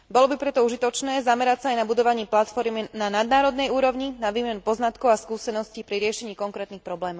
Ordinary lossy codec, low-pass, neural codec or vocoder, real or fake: none; none; none; real